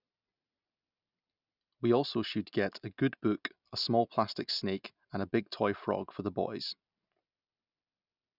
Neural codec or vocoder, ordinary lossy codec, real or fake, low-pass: none; none; real; 5.4 kHz